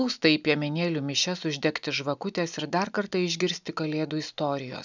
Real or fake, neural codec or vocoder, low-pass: real; none; 7.2 kHz